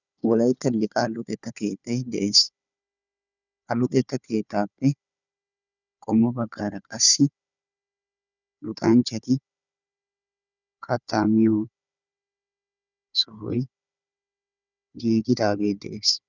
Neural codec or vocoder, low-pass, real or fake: codec, 16 kHz, 4 kbps, FunCodec, trained on Chinese and English, 50 frames a second; 7.2 kHz; fake